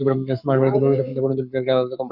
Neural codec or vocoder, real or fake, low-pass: codec, 44.1 kHz, 7.8 kbps, Pupu-Codec; fake; 5.4 kHz